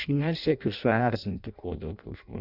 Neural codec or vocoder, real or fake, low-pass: codec, 16 kHz in and 24 kHz out, 0.6 kbps, FireRedTTS-2 codec; fake; 5.4 kHz